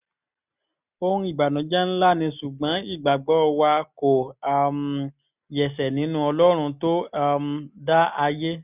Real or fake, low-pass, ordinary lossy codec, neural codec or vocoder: real; 3.6 kHz; none; none